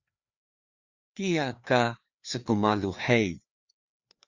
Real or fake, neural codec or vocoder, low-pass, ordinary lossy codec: fake; codec, 16 kHz, 2 kbps, FreqCodec, larger model; 7.2 kHz; Opus, 64 kbps